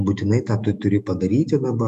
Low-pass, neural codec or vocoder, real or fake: 14.4 kHz; autoencoder, 48 kHz, 128 numbers a frame, DAC-VAE, trained on Japanese speech; fake